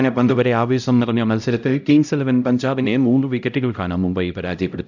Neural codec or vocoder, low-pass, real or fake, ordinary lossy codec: codec, 16 kHz, 0.5 kbps, X-Codec, HuBERT features, trained on LibriSpeech; 7.2 kHz; fake; none